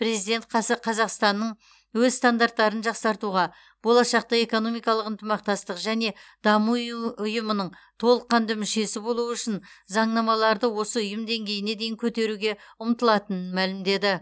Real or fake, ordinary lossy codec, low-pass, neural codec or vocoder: real; none; none; none